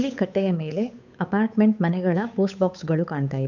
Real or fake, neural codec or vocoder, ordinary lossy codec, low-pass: fake; codec, 16 kHz, 8 kbps, FunCodec, trained on Chinese and English, 25 frames a second; none; 7.2 kHz